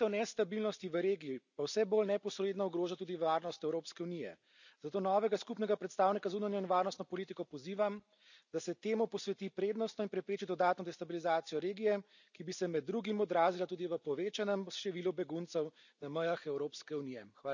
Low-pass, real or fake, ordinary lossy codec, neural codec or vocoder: 7.2 kHz; real; none; none